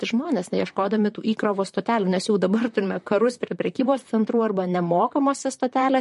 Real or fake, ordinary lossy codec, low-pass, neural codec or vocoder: fake; MP3, 48 kbps; 14.4 kHz; vocoder, 44.1 kHz, 128 mel bands, Pupu-Vocoder